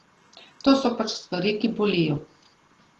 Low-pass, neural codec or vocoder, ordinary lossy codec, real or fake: 14.4 kHz; none; Opus, 16 kbps; real